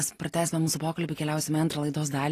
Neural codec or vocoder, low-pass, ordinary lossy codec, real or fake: none; 14.4 kHz; AAC, 48 kbps; real